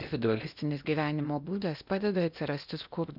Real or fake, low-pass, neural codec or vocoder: fake; 5.4 kHz; codec, 16 kHz in and 24 kHz out, 0.8 kbps, FocalCodec, streaming, 65536 codes